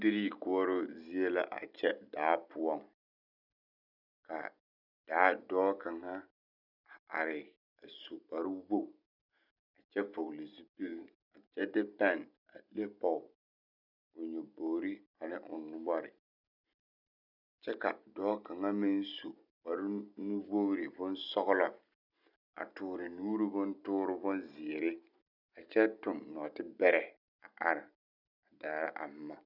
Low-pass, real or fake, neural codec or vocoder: 5.4 kHz; real; none